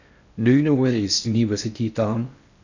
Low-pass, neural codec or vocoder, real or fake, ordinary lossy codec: 7.2 kHz; codec, 16 kHz in and 24 kHz out, 0.6 kbps, FocalCodec, streaming, 4096 codes; fake; none